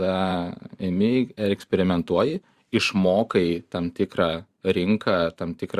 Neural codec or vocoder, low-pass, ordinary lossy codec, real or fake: vocoder, 44.1 kHz, 128 mel bands every 512 samples, BigVGAN v2; 14.4 kHz; Opus, 64 kbps; fake